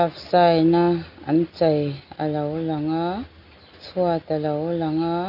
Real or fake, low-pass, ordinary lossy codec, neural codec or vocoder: real; 5.4 kHz; none; none